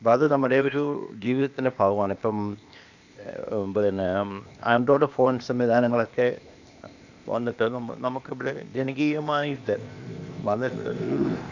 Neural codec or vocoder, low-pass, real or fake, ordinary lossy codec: codec, 16 kHz, 0.8 kbps, ZipCodec; 7.2 kHz; fake; none